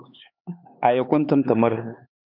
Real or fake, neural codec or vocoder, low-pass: fake; codec, 16 kHz, 4 kbps, X-Codec, HuBERT features, trained on LibriSpeech; 5.4 kHz